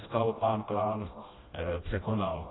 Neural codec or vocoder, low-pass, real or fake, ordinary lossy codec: codec, 16 kHz, 1 kbps, FreqCodec, smaller model; 7.2 kHz; fake; AAC, 16 kbps